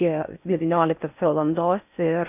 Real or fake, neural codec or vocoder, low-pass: fake; codec, 16 kHz in and 24 kHz out, 0.6 kbps, FocalCodec, streaming, 4096 codes; 3.6 kHz